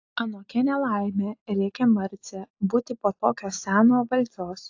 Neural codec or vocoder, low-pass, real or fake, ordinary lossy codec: none; 7.2 kHz; real; AAC, 48 kbps